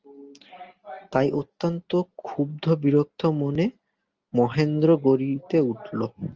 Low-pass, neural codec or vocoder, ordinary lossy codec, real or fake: 7.2 kHz; none; Opus, 24 kbps; real